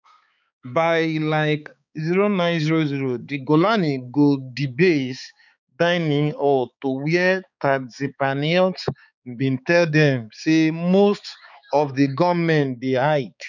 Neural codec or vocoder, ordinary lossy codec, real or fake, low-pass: codec, 16 kHz, 4 kbps, X-Codec, HuBERT features, trained on balanced general audio; none; fake; 7.2 kHz